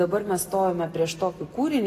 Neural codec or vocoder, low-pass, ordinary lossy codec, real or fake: none; 14.4 kHz; AAC, 64 kbps; real